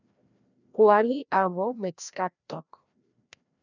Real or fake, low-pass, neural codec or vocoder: fake; 7.2 kHz; codec, 16 kHz, 1 kbps, FreqCodec, larger model